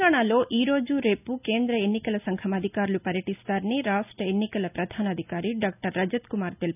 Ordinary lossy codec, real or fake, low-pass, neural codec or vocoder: none; real; 3.6 kHz; none